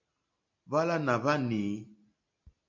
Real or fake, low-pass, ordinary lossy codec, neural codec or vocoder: real; 7.2 kHz; MP3, 64 kbps; none